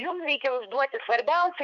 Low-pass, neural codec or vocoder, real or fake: 7.2 kHz; codec, 16 kHz, 4 kbps, X-Codec, HuBERT features, trained on balanced general audio; fake